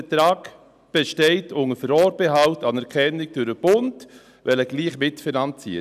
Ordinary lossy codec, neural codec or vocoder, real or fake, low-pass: none; none; real; 14.4 kHz